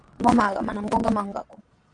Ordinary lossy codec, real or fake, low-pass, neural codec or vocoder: AAC, 48 kbps; fake; 9.9 kHz; vocoder, 22.05 kHz, 80 mel bands, Vocos